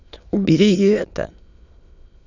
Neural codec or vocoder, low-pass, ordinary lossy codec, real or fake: autoencoder, 22.05 kHz, a latent of 192 numbers a frame, VITS, trained on many speakers; 7.2 kHz; none; fake